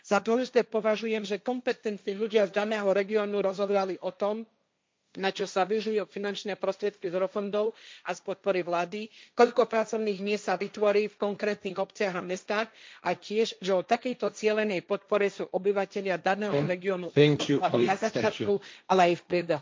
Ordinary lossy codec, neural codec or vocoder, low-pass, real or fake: none; codec, 16 kHz, 1.1 kbps, Voila-Tokenizer; none; fake